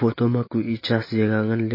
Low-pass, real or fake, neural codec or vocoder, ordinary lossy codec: 5.4 kHz; real; none; MP3, 24 kbps